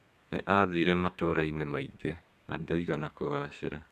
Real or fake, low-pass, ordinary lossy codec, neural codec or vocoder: fake; 14.4 kHz; none; codec, 32 kHz, 1.9 kbps, SNAC